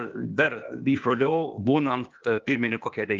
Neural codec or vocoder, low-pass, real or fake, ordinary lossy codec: codec, 16 kHz, 0.8 kbps, ZipCodec; 7.2 kHz; fake; Opus, 32 kbps